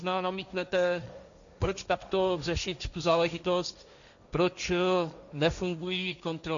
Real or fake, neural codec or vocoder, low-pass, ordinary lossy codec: fake; codec, 16 kHz, 1.1 kbps, Voila-Tokenizer; 7.2 kHz; MP3, 96 kbps